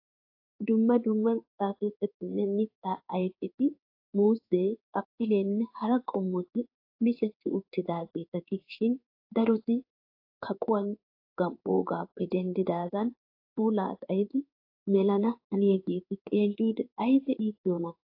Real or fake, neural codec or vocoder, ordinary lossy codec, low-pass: fake; codec, 16 kHz in and 24 kHz out, 1 kbps, XY-Tokenizer; AAC, 32 kbps; 5.4 kHz